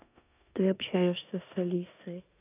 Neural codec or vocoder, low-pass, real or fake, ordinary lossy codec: codec, 16 kHz in and 24 kHz out, 0.9 kbps, LongCat-Audio-Codec, four codebook decoder; 3.6 kHz; fake; none